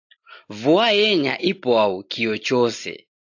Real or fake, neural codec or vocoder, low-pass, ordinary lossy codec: real; none; 7.2 kHz; AAC, 48 kbps